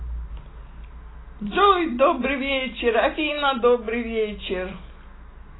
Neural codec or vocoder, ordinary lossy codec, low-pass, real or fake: none; AAC, 16 kbps; 7.2 kHz; real